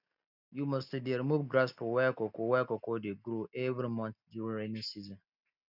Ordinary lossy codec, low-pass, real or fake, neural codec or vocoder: none; 5.4 kHz; real; none